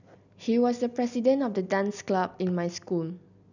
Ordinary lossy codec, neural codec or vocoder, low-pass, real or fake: none; none; 7.2 kHz; real